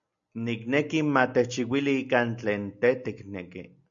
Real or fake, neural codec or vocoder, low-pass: real; none; 7.2 kHz